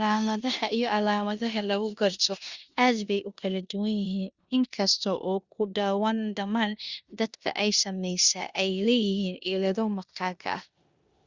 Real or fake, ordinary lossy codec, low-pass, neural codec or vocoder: fake; Opus, 64 kbps; 7.2 kHz; codec, 16 kHz in and 24 kHz out, 0.9 kbps, LongCat-Audio-Codec, four codebook decoder